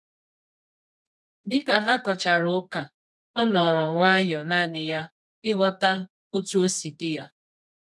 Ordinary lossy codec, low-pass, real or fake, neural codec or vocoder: none; none; fake; codec, 24 kHz, 0.9 kbps, WavTokenizer, medium music audio release